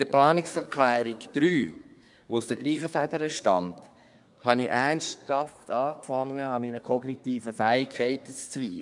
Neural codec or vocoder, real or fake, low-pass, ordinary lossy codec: codec, 24 kHz, 1 kbps, SNAC; fake; 10.8 kHz; none